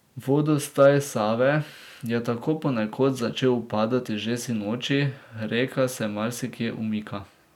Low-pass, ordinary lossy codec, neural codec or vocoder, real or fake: 19.8 kHz; none; none; real